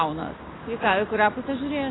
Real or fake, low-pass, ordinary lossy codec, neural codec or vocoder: real; 7.2 kHz; AAC, 16 kbps; none